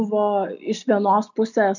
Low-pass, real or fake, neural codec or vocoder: 7.2 kHz; real; none